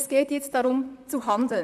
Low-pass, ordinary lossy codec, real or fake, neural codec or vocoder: 14.4 kHz; none; fake; vocoder, 44.1 kHz, 128 mel bands, Pupu-Vocoder